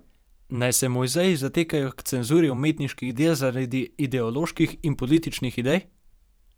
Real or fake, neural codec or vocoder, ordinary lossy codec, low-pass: fake; vocoder, 44.1 kHz, 128 mel bands every 512 samples, BigVGAN v2; none; none